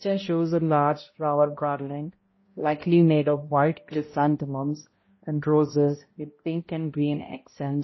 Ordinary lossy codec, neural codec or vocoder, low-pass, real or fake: MP3, 24 kbps; codec, 16 kHz, 0.5 kbps, X-Codec, HuBERT features, trained on balanced general audio; 7.2 kHz; fake